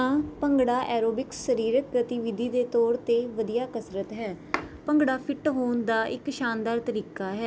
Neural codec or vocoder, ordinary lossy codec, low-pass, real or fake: none; none; none; real